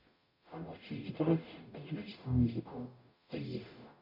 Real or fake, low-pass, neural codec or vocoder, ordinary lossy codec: fake; 5.4 kHz; codec, 44.1 kHz, 0.9 kbps, DAC; MP3, 48 kbps